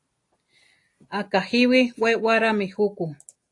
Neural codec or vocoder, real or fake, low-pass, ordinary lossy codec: none; real; 10.8 kHz; AAC, 48 kbps